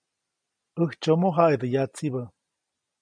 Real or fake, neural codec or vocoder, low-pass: real; none; 9.9 kHz